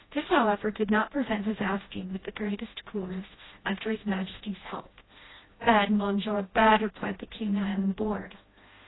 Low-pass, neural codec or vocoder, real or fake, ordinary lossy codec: 7.2 kHz; codec, 16 kHz, 1 kbps, FreqCodec, smaller model; fake; AAC, 16 kbps